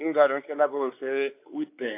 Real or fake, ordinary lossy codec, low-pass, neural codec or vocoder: fake; AAC, 24 kbps; 3.6 kHz; codec, 16 kHz, 4 kbps, X-Codec, WavLM features, trained on Multilingual LibriSpeech